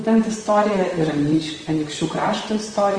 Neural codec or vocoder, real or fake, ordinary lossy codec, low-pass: vocoder, 44.1 kHz, 128 mel bands, Pupu-Vocoder; fake; Opus, 24 kbps; 9.9 kHz